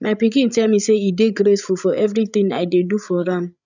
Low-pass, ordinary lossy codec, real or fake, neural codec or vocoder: 7.2 kHz; none; fake; codec, 16 kHz, 8 kbps, FreqCodec, larger model